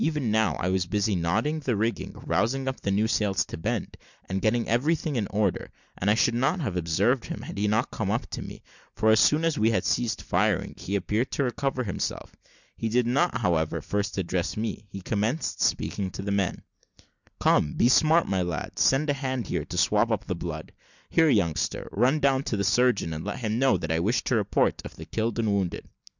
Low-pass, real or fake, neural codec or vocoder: 7.2 kHz; real; none